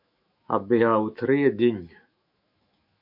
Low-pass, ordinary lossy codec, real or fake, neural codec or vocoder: 5.4 kHz; MP3, 48 kbps; fake; autoencoder, 48 kHz, 128 numbers a frame, DAC-VAE, trained on Japanese speech